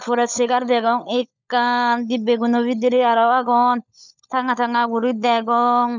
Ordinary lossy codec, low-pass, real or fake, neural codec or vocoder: none; 7.2 kHz; fake; codec, 16 kHz, 16 kbps, FunCodec, trained on LibriTTS, 50 frames a second